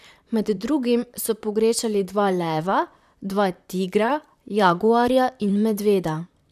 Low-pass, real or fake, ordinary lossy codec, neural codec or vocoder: 14.4 kHz; fake; none; vocoder, 44.1 kHz, 128 mel bands, Pupu-Vocoder